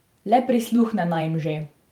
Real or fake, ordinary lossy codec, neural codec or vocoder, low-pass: fake; Opus, 32 kbps; vocoder, 44.1 kHz, 128 mel bands every 512 samples, BigVGAN v2; 19.8 kHz